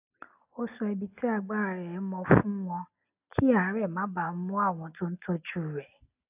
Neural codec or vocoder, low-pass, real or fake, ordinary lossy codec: none; 3.6 kHz; real; none